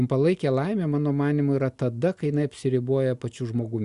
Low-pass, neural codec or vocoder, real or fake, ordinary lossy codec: 10.8 kHz; none; real; Opus, 64 kbps